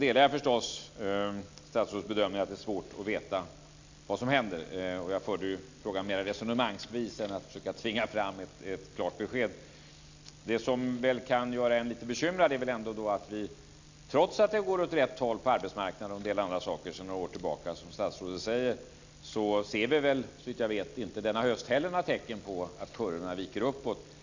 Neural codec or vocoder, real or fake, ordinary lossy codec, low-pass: none; real; Opus, 64 kbps; 7.2 kHz